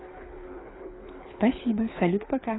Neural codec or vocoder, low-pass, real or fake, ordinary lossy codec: codec, 24 kHz, 6 kbps, HILCodec; 7.2 kHz; fake; AAC, 16 kbps